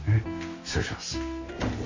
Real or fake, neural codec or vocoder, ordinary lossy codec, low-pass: real; none; MP3, 48 kbps; 7.2 kHz